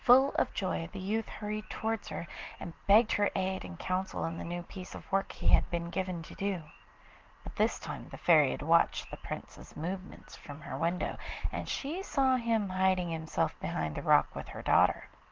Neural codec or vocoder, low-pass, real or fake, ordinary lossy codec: none; 7.2 kHz; real; Opus, 16 kbps